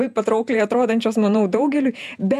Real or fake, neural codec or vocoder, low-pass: fake; vocoder, 48 kHz, 128 mel bands, Vocos; 14.4 kHz